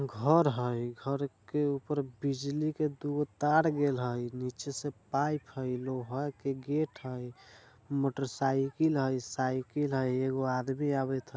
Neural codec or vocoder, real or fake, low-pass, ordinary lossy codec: none; real; none; none